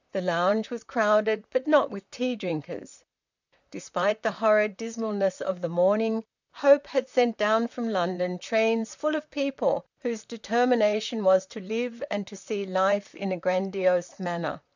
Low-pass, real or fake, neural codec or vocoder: 7.2 kHz; fake; vocoder, 44.1 kHz, 128 mel bands, Pupu-Vocoder